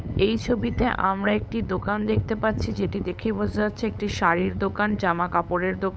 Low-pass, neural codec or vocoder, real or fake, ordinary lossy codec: none; codec, 16 kHz, 8 kbps, FunCodec, trained on LibriTTS, 25 frames a second; fake; none